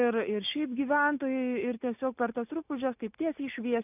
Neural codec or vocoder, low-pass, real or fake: none; 3.6 kHz; real